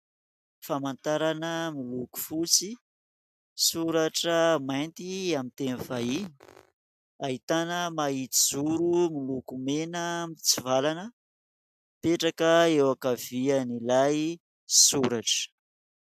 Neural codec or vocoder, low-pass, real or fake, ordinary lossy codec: none; 14.4 kHz; real; AAC, 96 kbps